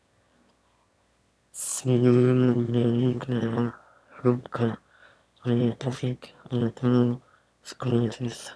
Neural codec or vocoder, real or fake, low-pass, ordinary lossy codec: autoencoder, 22.05 kHz, a latent of 192 numbers a frame, VITS, trained on one speaker; fake; none; none